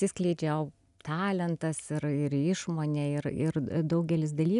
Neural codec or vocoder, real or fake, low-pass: none; real; 10.8 kHz